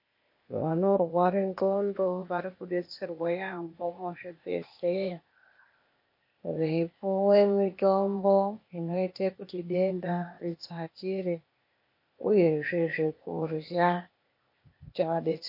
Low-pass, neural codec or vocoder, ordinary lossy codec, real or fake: 5.4 kHz; codec, 16 kHz, 0.8 kbps, ZipCodec; MP3, 32 kbps; fake